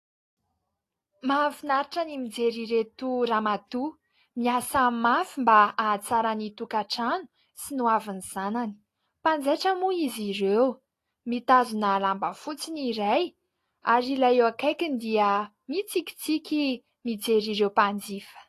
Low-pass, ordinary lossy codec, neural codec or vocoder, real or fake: 14.4 kHz; AAC, 48 kbps; none; real